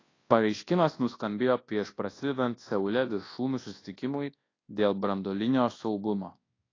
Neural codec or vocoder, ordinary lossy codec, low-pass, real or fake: codec, 24 kHz, 0.9 kbps, WavTokenizer, large speech release; AAC, 32 kbps; 7.2 kHz; fake